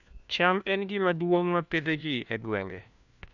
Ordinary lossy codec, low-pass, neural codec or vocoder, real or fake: none; 7.2 kHz; codec, 16 kHz, 1 kbps, FunCodec, trained on LibriTTS, 50 frames a second; fake